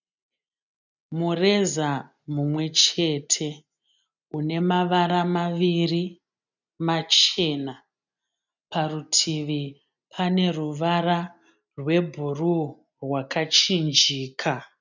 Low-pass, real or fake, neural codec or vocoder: 7.2 kHz; real; none